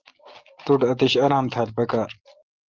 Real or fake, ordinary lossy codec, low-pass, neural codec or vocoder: real; Opus, 16 kbps; 7.2 kHz; none